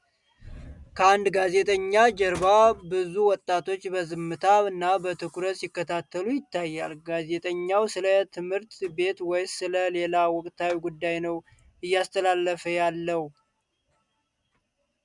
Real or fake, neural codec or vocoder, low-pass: real; none; 10.8 kHz